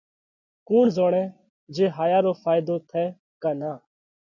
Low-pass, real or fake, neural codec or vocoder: 7.2 kHz; real; none